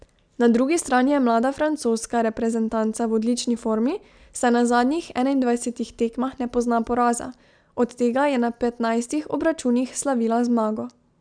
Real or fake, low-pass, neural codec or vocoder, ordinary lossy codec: real; 9.9 kHz; none; none